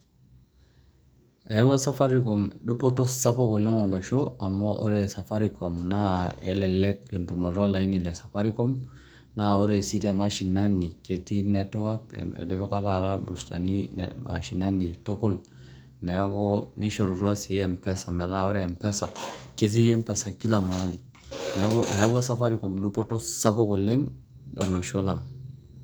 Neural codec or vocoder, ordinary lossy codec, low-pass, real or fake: codec, 44.1 kHz, 2.6 kbps, SNAC; none; none; fake